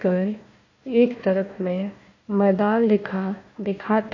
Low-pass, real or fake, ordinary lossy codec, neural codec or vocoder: 7.2 kHz; fake; AAC, 48 kbps; codec, 16 kHz, 1 kbps, FunCodec, trained on Chinese and English, 50 frames a second